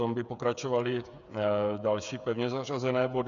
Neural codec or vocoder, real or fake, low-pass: codec, 16 kHz, 8 kbps, FreqCodec, smaller model; fake; 7.2 kHz